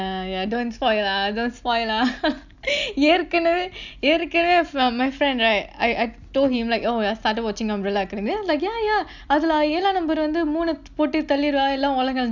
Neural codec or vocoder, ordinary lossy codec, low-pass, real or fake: none; none; 7.2 kHz; real